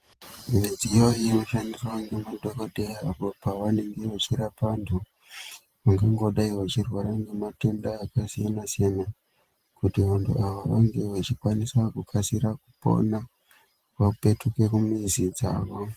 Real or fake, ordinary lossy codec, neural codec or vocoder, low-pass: fake; Opus, 24 kbps; vocoder, 44.1 kHz, 128 mel bands every 512 samples, BigVGAN v2; 14.4 kHz